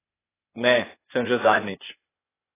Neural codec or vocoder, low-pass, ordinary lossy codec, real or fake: codec, 16 kHz, 0.8 kbps, ZipCodec; 3.6 kHz; AAC, 16 kbps; fake